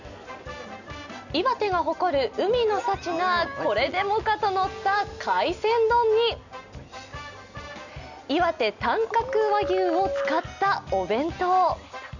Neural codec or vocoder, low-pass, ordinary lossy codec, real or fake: none; 7.2 kHz; none; real